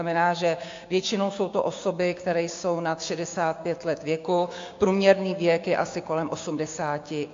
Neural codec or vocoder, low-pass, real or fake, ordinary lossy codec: codec, 16 kHz, 6 kbps, DAC; 7.2 kHz; fake; AAC, 48 kbps